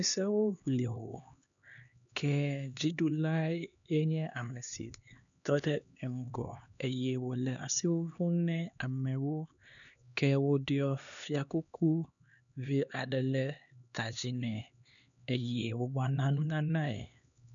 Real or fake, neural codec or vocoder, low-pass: fake; codec, 16 kHz, 2 kbps, X-Codec, HuBERT features, trained on LibriSpeech; 7.2 kHz